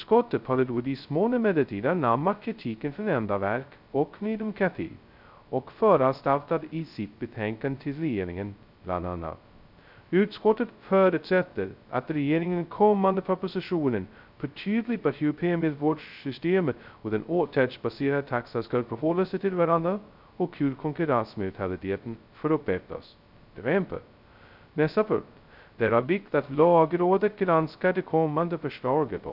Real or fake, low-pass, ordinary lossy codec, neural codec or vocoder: fake; 5.4 kHz; none; codec, 16 kHz, 0.2 kbps, FocalCodec